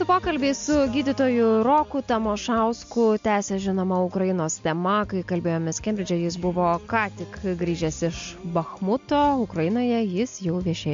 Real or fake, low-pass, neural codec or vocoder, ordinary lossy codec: real; 7.2 kHz; none; MP3, 48 kbps